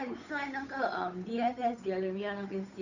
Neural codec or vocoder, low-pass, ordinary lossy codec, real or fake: codec, 16 kHz, 8 kbps, FunCodec, trained on Chinese and English, 25 frames a second; 7.2 kHz; none; fake